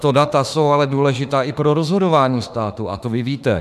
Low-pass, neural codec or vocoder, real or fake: 14.4 kHz; autoencoder, 48 kHz, 32 numbers a frame, DAC-VAE, trained on Japanese speech; fake